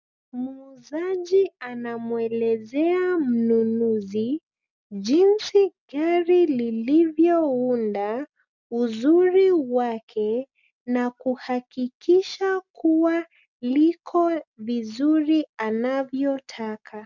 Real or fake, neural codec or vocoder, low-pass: real; none; 7.2 kHz